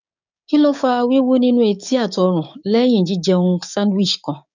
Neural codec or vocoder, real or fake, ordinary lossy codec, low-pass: codec, 16 kHz, 6 kbps, DAC; fake; none; 7.2 kHz